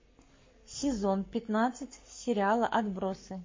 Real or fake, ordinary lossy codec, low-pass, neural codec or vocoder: fake; MP3, 32 kbps; 7.2 kHz; codec, 44.1 kHz, 7.8 kbps, Pupu-Codec